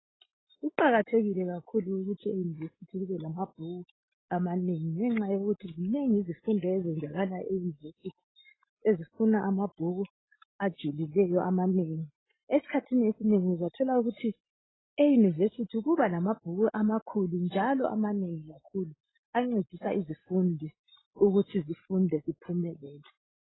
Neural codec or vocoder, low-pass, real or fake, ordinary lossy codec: none; 7.2 kHz; real; AAC, 16 kbps